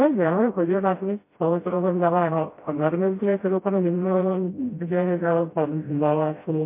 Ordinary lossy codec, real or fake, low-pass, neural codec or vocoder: MP3, 24 kbps; fake; 3.6 kHz; codec, 16 kHz, 0.5 kbps, FreqCodec, smaller model